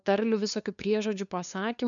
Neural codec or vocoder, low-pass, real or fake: codec, 16 kHz, 2 kbps, FunCodec, trained on LibriTTS, 25 frames a second; 7.2 kHz; fake